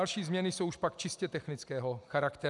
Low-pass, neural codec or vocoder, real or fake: 10.8 kHz; none; real